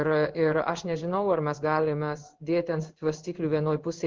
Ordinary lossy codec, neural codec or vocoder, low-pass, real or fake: Opus, 16 kbps; codec, 16 kHz in and 24 kHz out, 1 kbps, XY-Tokenizer; 7.2 kHz; fake